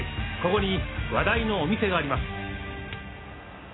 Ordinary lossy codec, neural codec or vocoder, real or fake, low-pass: AAC, 16 kbps; none; real; 7.2 kHz